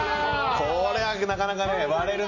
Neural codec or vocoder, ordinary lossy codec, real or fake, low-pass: none; none; real; 7.2 kHz